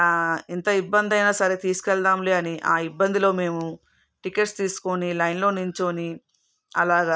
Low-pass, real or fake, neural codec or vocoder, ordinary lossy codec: none; real; none; none